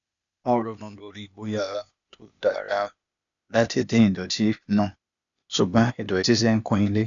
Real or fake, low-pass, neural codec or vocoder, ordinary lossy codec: fake; 7.2 kHz; codec, 16 kHz, 0.8 kbps, ZipCodec; none